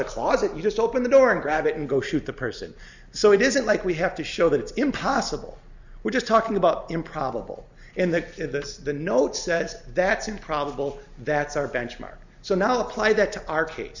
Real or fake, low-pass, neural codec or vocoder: real; 7.2 kHz; none